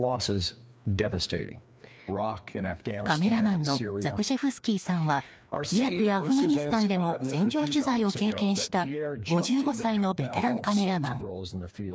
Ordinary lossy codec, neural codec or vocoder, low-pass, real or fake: none; codec, 16 kHz, 2 kbps, FreqCodec, larger model; none; fake